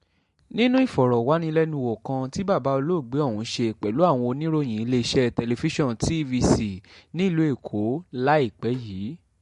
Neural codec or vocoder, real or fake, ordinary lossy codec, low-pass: none; real; MP3, 48 kbps; 14.4 kHz